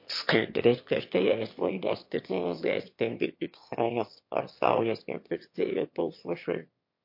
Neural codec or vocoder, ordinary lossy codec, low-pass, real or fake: autoencoder, 22.05 kHz, a latent of 192 numbers a frame, VITS, trained on one speaker; MP3, 32 kbps; 5.4 kHz; fake